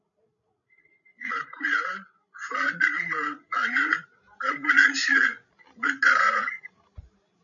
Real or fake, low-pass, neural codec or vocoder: fake; 7.2 kHz; codec, 16 kHz, 16 kbps, FreqCodec, larger model